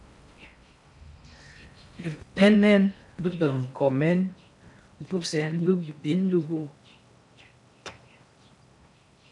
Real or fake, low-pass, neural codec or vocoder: fake; 10.8 kHz; codec, 16 kHz in and 24 kHz out, 0.6 kbps, FocalCodec, streaming, 4096 codes